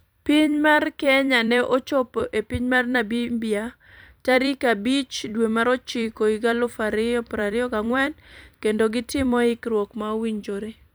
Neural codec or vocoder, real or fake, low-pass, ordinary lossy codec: vocoder, 44.1 kHz, 128 mel bands every 256 samples, BigVGAN v2; fake; none; none